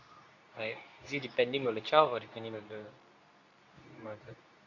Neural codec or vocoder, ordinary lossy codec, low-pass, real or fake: codec, 24 kHz, 0.9 kbps, WavTokenizer, medium speech release version 2; none; 7.2 kHz; fake